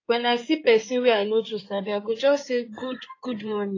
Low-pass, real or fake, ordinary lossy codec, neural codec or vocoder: 7.2 kHz; fake; AAC, 32 kbps; codec, 16 kHz in and 24 kHz out, 2.2 kbps, FireRedTTS-2 codec